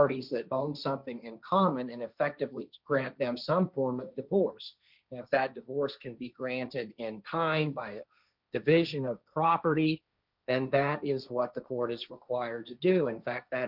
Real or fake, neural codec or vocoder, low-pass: fake; codec, 16 kHz, 1.1 kbps, Voila-Tokenizer; 5.4 kHz